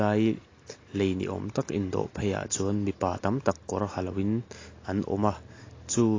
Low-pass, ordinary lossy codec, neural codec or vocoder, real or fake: 7.2 kHz; AAC, 32 kbps; none; real